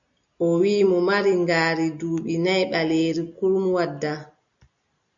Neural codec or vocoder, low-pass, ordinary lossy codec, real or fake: none; 7.2 kHz; MP3, 96 kbps; real